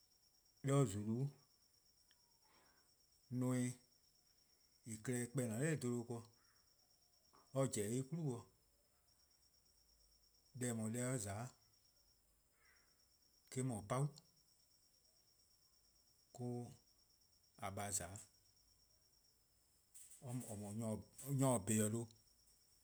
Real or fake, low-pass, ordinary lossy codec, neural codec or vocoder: real; none; none; none